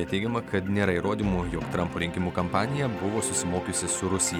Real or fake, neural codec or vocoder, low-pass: real; none; 19.8 kHz